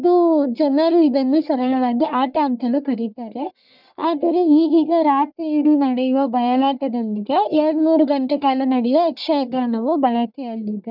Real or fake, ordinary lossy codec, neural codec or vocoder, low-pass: fake; none; codec, 44.1 kHz, 1.7 kbps, Pupu-Codec; 5.4 kHz